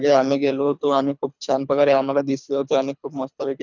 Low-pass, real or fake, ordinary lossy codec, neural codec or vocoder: 7.2 kHz; fake; none; codec, 24 kHz, 3 kbps, HILCodec